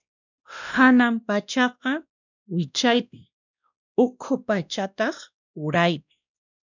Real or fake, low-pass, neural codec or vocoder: fake; 7.2 kHz; codec, 16 kHz, 1 kbps, X-Codec, WavLM features, trained on Multilingual LibriSpeech